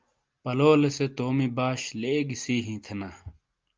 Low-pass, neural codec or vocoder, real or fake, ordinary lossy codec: 7.2 kHz; none; real; Opus, 24 kbps